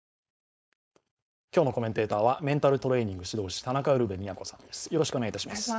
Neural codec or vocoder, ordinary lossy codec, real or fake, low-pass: codec, 16 kHz, 4.8 kbps, FACodec; none; fake; none